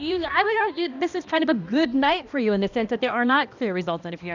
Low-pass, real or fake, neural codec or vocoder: 7.2 kHz; fake; codec, 16 kHz, 1 kbps, X-Codec, HuBERT features, trained on balanced general audio